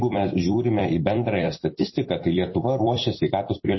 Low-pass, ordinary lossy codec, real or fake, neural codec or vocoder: 7.2 kHz; MP3, 24 kbps; fake; vocoder, 44.1 kHz, 128 mel bands every 256 samples, BigVGAN v2